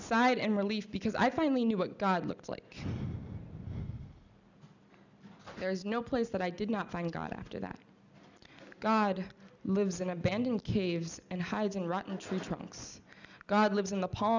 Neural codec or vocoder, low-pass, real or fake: none; 7.2 kHz; real